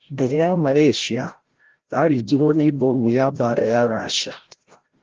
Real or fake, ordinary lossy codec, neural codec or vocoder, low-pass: fake; Opus, 16 kbps; codec, 16 kHz, 0.5 kbps, FreqCodec, larger model; 7.2 kHz